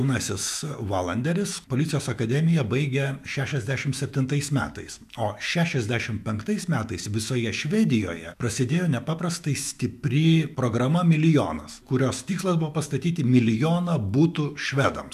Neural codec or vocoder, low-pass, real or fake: autoencoder, 48 kHz, 128 numbers a frame, DAC-VAE, trained on Japanese speech; 14.4 kHz; fake